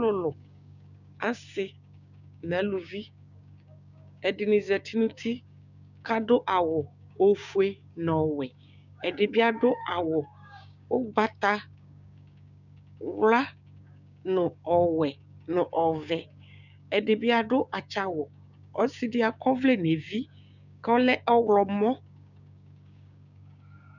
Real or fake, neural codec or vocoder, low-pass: fake; codec, 16 kHz, 6 kbps, DAC; 7.2 kHz